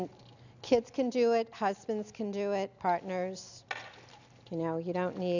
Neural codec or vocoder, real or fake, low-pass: none; real; 7.2 kHz